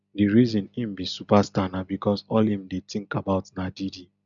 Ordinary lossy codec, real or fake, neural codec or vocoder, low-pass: none; real; none; 7.2 kHz